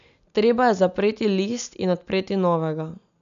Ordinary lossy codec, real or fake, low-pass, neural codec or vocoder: none; real; 7.2 kHz; none